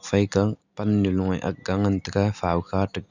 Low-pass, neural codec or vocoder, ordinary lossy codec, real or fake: 7.2 kHz; none; none; real